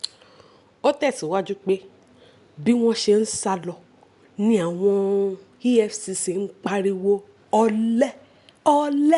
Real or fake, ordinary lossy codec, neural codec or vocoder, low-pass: real; none; none; 10.8 kHz